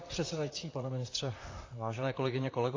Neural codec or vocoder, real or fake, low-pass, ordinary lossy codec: vocoder, 44.1 kHz, 128 mel bands, Pupu-Vocoder; fake; 7.2 kHz; AAC, 32 kbps